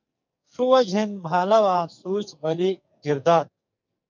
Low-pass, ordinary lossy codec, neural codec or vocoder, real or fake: 7.2 kHz; MP3, 64 kbps; codec, 44.1 kHz, 2.6 kbps, SNAC; fake